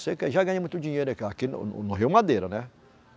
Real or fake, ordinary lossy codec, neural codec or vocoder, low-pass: real; none; none; none